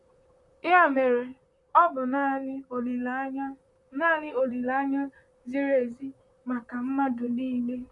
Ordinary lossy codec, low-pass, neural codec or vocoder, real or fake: none; 10.8 kHz; vocoder, 44.1 kHz, 128 mel bands, Pupu-Vocoder; fake